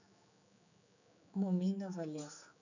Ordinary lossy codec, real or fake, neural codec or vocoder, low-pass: none; fake; codec, 16 kHz, 4 kbps, X-Codec, HuBERT features, trained on general audio; 7.2 kHz